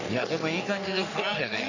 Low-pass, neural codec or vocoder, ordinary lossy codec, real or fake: 7.2 kHz; codec, 44.1 kHz, 3.4 kbps, Pupu-Codec; none; fake